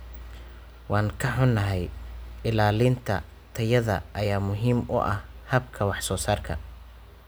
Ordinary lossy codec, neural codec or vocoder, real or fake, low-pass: none; none; real; none